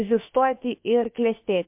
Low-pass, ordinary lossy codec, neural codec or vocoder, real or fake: 3.6 kHz; MP3, 32 kbps; codec, 16 kHz, about 1 kbps, DyCAST, with the encoder's durations; fake